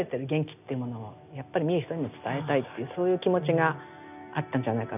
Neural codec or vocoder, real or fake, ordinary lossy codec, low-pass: none; real; none; 3.6 kHz